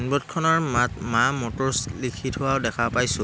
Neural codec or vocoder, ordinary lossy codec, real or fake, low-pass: none; none; real; none